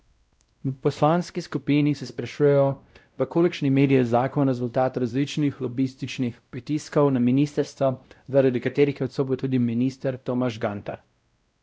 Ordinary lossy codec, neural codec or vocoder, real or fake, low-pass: none; codec, 16 kHz, 0.5 kbps, X-Codec, WavLM features, trained on Multilingual LibriSpeech; fake; none